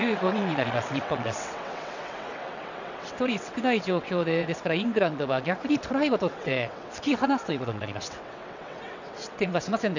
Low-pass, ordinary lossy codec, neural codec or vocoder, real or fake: 7.2 kHz; none; vocoder, 22.05 kHz, 80 mel bands, WaveNeXt; fake